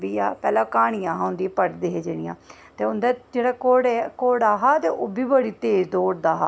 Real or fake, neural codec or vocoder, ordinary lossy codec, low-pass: real; none; none; none